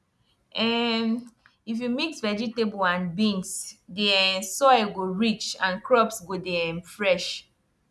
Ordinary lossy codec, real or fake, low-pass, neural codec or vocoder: none; real; none; none